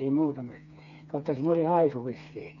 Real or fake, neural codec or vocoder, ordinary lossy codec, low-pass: fake; codec, 16 kHz, 4 kbps, FreqCodec, smaller model; none; 7.2 kHz